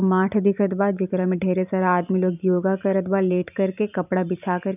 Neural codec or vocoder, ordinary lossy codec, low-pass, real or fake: vocoder, 44.1 kHz, 128 mel bands every 512 samples, BigVGAN v2; none; 3.6 kHz; fake